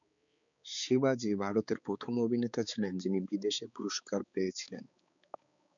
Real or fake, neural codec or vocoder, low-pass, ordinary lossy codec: fake; codec, 16 kHz, 4 kbps, X-Codec, HuBERT features, trained on balanced general audio; 7.2 kHz; MP3, 96 kbps